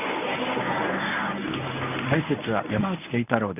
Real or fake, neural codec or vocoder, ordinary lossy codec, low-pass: fake; codec, 24 kHz, 0.9 kbps, WavTokenizer, medium speech release version 1; none; 3.6 kHz